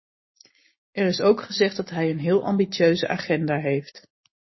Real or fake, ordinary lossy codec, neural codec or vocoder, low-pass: real; MP3, 24 kbps; none; 7.2 kHz